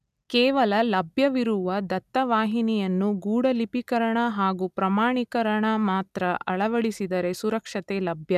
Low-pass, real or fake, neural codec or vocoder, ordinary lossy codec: 14.4 kHz; real; none; none